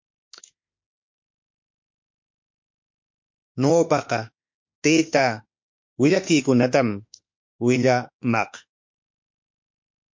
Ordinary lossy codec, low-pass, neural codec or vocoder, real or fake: MP3, 48 kbps; 7.2 kHz; autoencoder, 48 kHz, 32 numbers a frame, DAC-VAE, trained on Japanese speech; fake